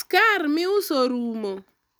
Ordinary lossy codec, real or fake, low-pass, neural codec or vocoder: none; real; none; none